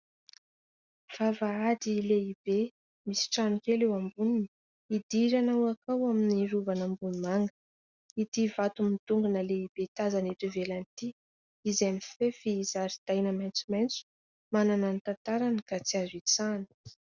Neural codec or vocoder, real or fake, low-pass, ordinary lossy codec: none; real; 7.2 kHz; Opus, 64 kbps